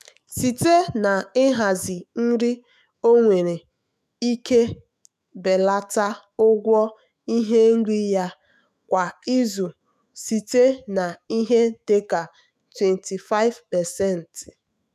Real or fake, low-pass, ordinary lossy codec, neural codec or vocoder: fake; 14.4 kHz; none; autoencoder, 48 kHz, 128 numbers a frame, DAC-VAE, trained on Japanese speech